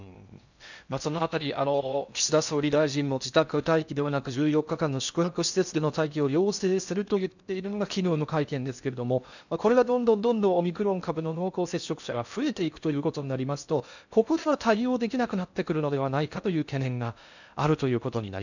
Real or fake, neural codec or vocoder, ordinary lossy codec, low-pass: fake; codec, 16 kHz in and 24 kHz out, 0.6 kbps, FocalCodec, streaming, 4096 codes; none; 7.2 kHz